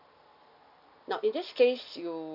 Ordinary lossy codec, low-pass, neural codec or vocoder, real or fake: none; 5.4 kHz; none; real